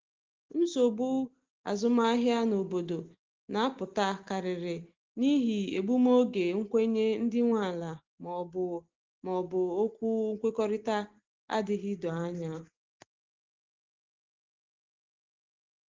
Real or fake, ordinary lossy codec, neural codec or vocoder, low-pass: real; Opus, 16 kbps; none; 7.2 kHz